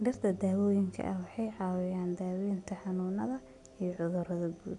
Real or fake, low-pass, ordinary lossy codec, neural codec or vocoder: real; 10.8 kHz; none; none